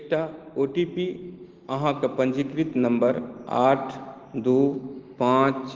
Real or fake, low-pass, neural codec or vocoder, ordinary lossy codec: real; 7.2 kHz; none; Opus, 16 kbps